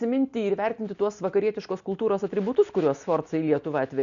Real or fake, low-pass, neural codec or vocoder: real; 7.2 kHz; none